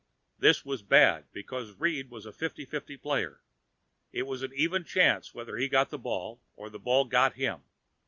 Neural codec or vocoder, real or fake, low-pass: none; real; 7.2 kHz